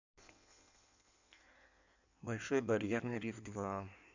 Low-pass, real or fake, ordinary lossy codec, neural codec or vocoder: 7.2 kHz; fake; none; codec, 16 kHz in and 24 kHz out, 1.1 kbps, FireRedTTS-2 codec